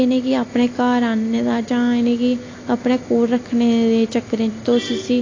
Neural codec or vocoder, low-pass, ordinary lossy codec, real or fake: none; 7.2 kHz; AAC, 32 kbps; real